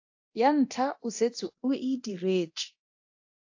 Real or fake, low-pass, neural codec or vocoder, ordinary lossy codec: fake; 7.2 kHz; codec, 24 kHz, 0.9 kbps, DualCodec; AAC, 48 kbps